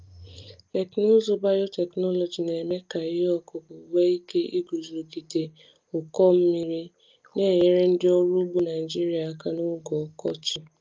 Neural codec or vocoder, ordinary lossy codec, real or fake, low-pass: none; Opus, 24 kbps; real; 7.2 kHz